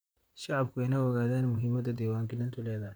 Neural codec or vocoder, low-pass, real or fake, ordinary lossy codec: vocoder, 44.1 kHz, 128 mel bands, Pupu-Vocoder; none; fake; none